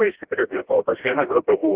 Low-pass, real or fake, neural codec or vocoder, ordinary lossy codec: 3.6 kHz; fake; codec, 16 kHz, 1 kbps, FreqCodec, smaller model; Opus, 16 kbps